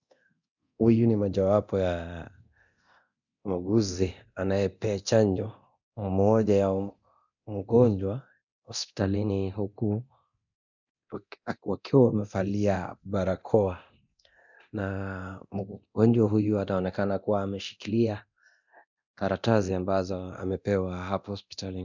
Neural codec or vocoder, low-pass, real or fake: codec, 24 kHz, 0.9 kbps, DualCodec; 7.2 kHz; fake